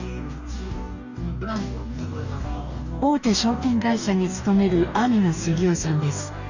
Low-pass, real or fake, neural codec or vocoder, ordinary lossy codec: 7.2 kHz; fake; codec, 44.1 kHz, 2.6 kbps, DAC; none